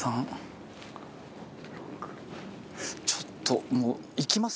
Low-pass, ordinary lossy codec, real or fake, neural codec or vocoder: none; none; real; none